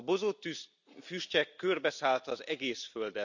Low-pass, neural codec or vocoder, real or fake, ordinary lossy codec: 7.2 kHz; none; real; none